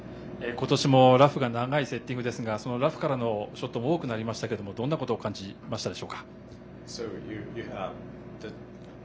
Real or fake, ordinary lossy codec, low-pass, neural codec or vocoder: real; none; none; none